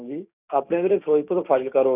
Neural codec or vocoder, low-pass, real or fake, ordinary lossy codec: codec, 16 kHz, 6 kbps, DAC; 3.6 kHz; fake; none